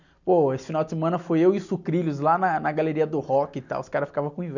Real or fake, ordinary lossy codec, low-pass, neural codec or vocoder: real; none; 7.2 kHz; none